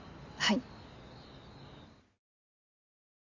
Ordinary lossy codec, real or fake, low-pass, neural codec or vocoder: Opus, 64 kbps; real; 7.2 kHz; none